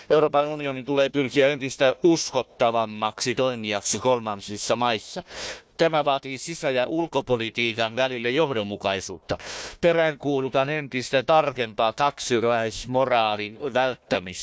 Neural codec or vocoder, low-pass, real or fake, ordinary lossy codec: codec, 16 kHz, 1 kbps, FunCodec, trained on Chinese and English, 50 frames a second; none; fake; none